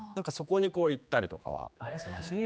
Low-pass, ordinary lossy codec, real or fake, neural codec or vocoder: none; none; fake; codec, 16 kHz, 2 kbps, X-Codec, HuBERT features, trained on general audio